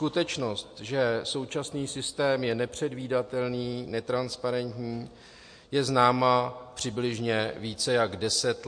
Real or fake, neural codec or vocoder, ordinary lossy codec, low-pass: real; none; MP3, 48 kbps; 9.9 kHz